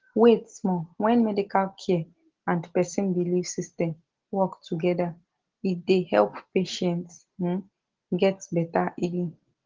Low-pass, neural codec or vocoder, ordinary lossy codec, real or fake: 7.2 kHz; none; Opus, 16 kbps; real